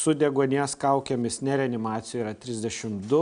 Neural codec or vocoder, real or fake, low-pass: none; real; 9.9 kHz